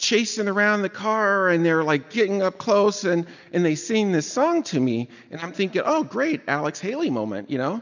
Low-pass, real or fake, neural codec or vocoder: 7.2 kHz; real; none